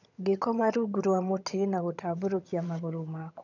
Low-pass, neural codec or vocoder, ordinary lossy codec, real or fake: 7.2 kHz; vocoder, 22.05 kHz, 80 mel bands, HiFi-GAN; none; fake